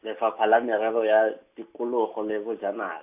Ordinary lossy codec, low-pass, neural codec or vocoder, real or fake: AAC, 32 kbps; 3.6 kHz; none; real